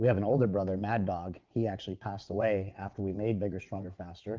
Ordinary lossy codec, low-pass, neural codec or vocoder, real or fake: Opus, 32 kbps; 7.2 kHz; vocoder, 22.05 kHz, 80 mel bands, WaveNeXt; fake